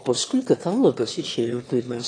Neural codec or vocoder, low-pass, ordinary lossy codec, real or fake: autoencoder, 22.05 kHz, a latent of 192 numbers a frame, VITS, trained on one speaker; 9.9 kHz; AAC, 32 kbps; fake